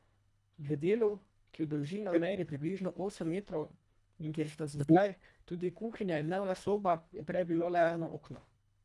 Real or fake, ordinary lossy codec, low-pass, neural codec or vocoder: fake; none; none; codec, 24 kHz, 1.5 kbps, HILCodec